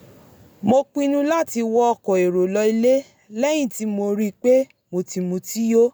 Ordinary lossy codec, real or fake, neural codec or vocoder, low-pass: none; real; none; none